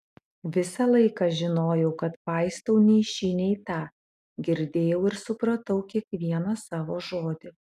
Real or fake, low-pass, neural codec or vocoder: real; 14.4 kHz; none